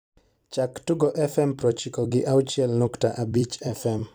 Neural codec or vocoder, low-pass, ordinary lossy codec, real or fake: vocoder, 44.1 kHz, 128 mel bands every 256 samples, BigVGAN v2; none; none; fake